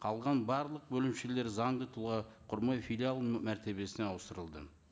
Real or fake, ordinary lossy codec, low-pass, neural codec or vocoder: real; none; none; none